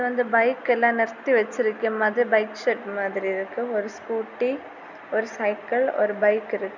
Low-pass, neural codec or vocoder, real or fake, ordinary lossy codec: 7.2 kHz; none; real; none